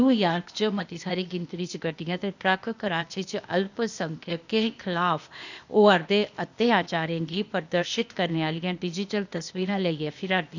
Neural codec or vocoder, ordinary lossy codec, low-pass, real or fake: codec, 16 kHz, 0.8 kbps, ZipCodec; none; 7.2 kHz; fake